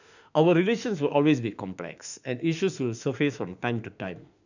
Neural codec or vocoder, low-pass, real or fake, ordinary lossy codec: autoencoder, 48 kHz, 32 numbers a frame, DAC-VAE, trained on Japanese speech; 7.2 kHz; fake; none